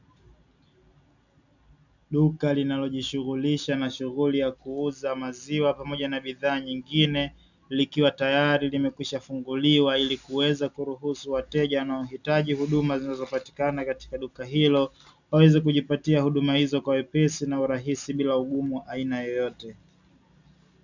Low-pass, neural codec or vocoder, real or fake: 7.2 kHz; none; real